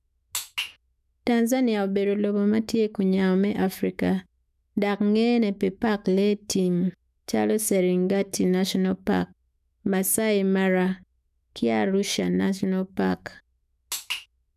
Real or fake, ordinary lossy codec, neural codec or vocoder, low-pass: fake; none; autoencoder, 48 kHz, 128 numbers a frame, DAC-VAE, trained on Japanese speech; 14.4 kHz